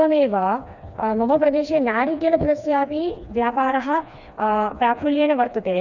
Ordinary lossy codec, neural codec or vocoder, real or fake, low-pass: none; codec, 16 kHz, 2 kbps, FreqCodec, smaller model; fake; 7.2 kHz